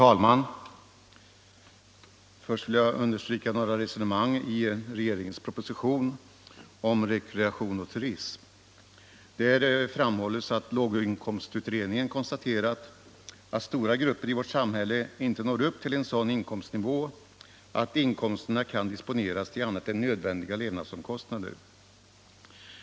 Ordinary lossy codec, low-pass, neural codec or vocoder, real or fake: none; none; none; real